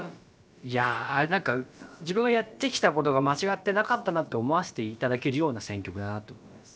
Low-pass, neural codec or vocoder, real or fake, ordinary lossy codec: none; codec, 16 kHz, about 1 kbps, DyCAST, with the encoder's durations; fake; none